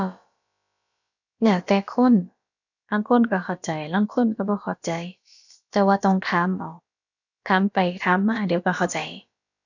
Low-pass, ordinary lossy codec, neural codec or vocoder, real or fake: 7.2 kHz; none; codec, 16 kHz, about 1 kbps, DyCAST, with the encoder's durations; fake